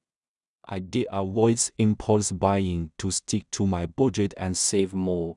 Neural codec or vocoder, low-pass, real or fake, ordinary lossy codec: codec, 16 kHz in and 24 kHz out, 0.4 kbps, LongCat-Audio-Codec, two codebook decoder; 10.8 kHz; fake; none